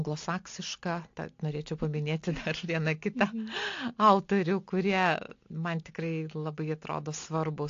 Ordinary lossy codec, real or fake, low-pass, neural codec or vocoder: AAC, 48 kbps; real; 7.2 kHz; none